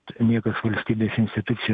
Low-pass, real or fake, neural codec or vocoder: 9.9 kHz; real; none